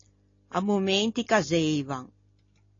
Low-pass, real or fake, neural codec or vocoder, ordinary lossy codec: 7.2 kHz; real; none; AAC, 32 kbps